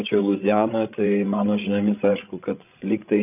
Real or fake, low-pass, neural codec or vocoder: fake; 3.6 kHz; codec, 16 kHz, 16 kbps, FreqCodec, larger model